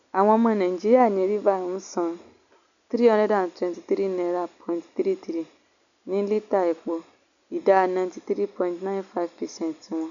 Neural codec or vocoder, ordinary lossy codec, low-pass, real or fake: none; none; 7.2 kHz; real